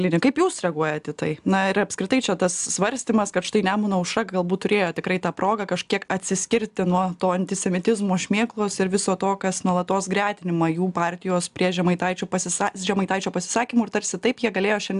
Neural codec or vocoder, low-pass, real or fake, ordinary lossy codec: none; 10.8 kHz; real; AAC, 96 kbps